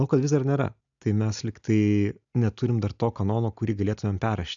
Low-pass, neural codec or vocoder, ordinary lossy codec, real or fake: 7.2 kHz; none; MP3, 96 kbps; real